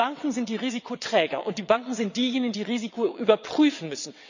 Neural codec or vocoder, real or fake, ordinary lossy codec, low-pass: vocoder, 22.05 kHz, 80 mel bands, WaveNeXt; fake; none; 7.2 kHz